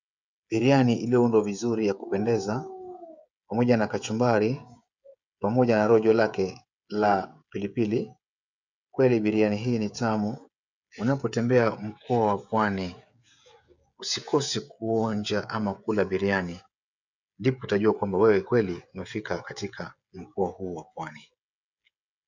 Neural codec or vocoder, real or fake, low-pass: codec, 16 kHz, 16 kbps, FreqCodec, smaller model; fake; 7.2 kHz